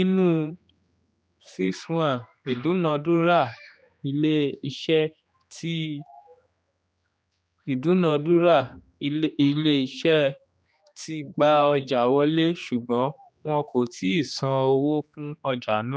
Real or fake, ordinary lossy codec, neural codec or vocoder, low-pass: fake; none; codec, 16 kHz, 2 kbps, X-Codec, HuBERT features, trained on general audio; none